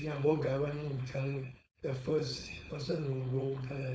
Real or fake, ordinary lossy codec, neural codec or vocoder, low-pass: fake; none; codec, 16 kHz, 4.8 kbps, FACodec; none